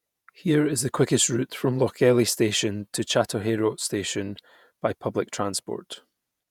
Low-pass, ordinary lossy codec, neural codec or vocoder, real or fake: 19.8 kHz; none; vocoder, 48 kHz, 128 mel bands, Vocos; fake